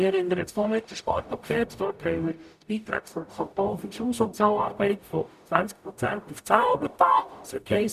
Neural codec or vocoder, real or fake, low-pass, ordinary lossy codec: codec, 44.1 kHz, 0.9 kbps, DAC; fake; 14.4 kHz; none